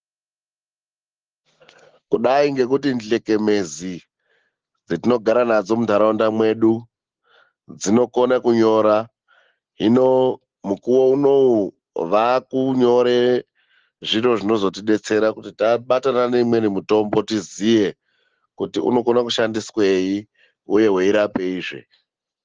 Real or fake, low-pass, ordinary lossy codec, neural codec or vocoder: real; 7.2 kHz; Opus, 16 kbps; none